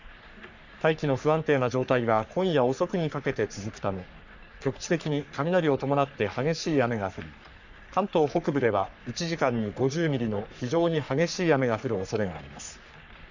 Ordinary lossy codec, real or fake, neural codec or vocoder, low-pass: none; fake; codec, 44.1 kHz, 3.4 kbps, Pupu-Codec; 7.2 kHz